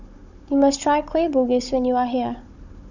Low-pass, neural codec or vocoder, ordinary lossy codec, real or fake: 7.2 kHz; codec, 16 kHz, 16 kbps, FunCodec, trained on Chinese and English, 50 frames a second; none; fake